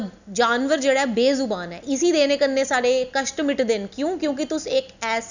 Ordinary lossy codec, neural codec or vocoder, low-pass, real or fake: none; none; 7.2 kHz; real